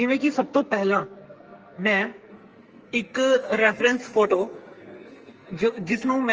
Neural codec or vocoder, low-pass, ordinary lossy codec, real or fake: codec, 44.1 kHz, 2.6 kbps, SNAC; 7.2 kHz; Opus, 24 kbps; fake